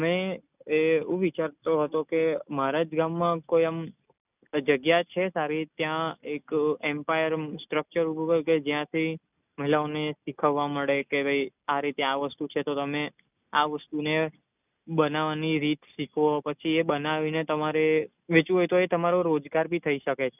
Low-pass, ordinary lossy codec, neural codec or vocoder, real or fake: 3.6 kHz; none; none; real